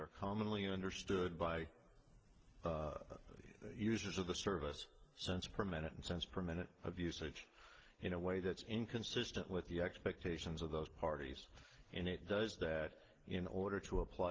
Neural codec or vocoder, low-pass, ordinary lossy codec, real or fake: none; 7.2 kHz; Opus, 16 kbps; real